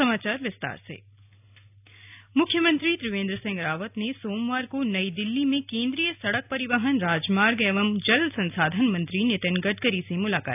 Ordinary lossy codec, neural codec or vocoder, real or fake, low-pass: none; none; real; 3.6 kHz